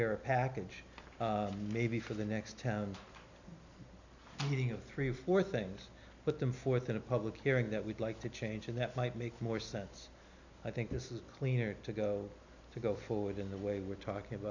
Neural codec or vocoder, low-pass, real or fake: none; 7.2 kHz; real